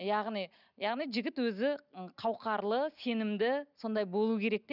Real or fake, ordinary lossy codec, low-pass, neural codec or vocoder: real; none; 5.4 kHz; none